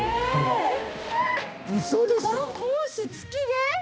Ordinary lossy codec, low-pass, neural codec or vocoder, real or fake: none; none; codec, 16 kHz, 1 kbps, X-Codec, HuBERT features, trained on balanced general audio; fake